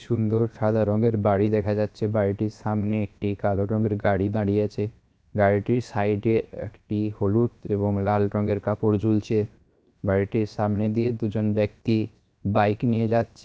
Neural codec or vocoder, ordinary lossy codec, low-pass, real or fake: codec, 16 kHz, 0.7 kbps, FocalCodec; none; none; fake